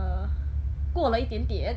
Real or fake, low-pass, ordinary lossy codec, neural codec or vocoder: real; none; none; none